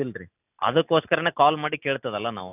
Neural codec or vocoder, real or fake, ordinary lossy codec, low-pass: none; real; none; 3.6 kHz